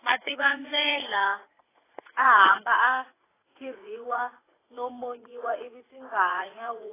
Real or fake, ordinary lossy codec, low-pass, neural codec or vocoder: fake; AAC, 16 kbps; 3.6 kHz; vocoder, 44.1 kHz, 80 mel bands, Vocos